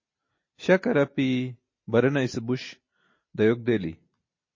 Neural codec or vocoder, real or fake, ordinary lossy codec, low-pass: none; real; MP3, 32 kbps; 7.2 kHz